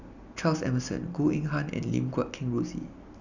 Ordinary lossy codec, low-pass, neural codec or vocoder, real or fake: none; 7.2 kHz; none; real